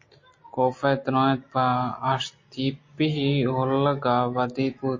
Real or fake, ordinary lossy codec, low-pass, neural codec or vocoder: real; MP3, 32 kbps; 7.2 kHz; none